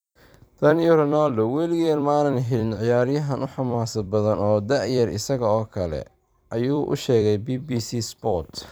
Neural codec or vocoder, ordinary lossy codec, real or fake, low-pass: vocoder, 44.1 kHz, 128 mel bands every 256 samples, BigVGAN v2; none; fake; none